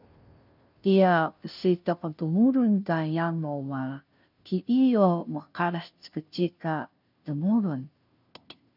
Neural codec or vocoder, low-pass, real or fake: codec, 16 kHz, 0.5 kbps, FunCodec, trained on Chinese and English, 25 frames a second; 5.4 kHz; fake